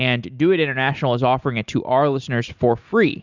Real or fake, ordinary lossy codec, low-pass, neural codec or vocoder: real; Opus, 64 kbps; 7.2 kHz; none